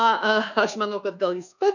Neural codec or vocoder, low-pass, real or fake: codec, 24 kHz, 1.2 kbps, DualCodec; 7.2 kHz; fake